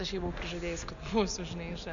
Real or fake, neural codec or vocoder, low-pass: real; none; 7.2 kHz